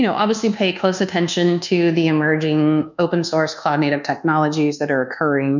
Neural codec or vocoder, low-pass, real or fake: codec, 24 kHz, 1.2 kbps, DualCodec; 7.2 kHz; fake